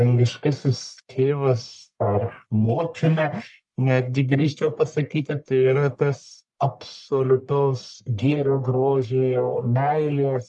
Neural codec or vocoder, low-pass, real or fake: codec, 44.1 kHz, 1.7 kbps, Pupu-Codec; 10.8 kHz; fake